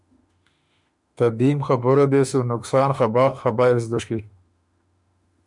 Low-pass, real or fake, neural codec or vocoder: 10.8 kHz; fake; autoencoder, 48 kHz, 32 numbers a frame, DAC-VAE, trained on Japanese speech